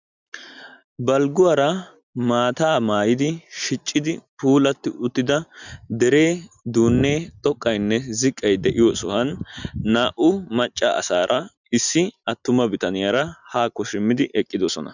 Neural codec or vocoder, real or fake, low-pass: none; real; 7.2 kHz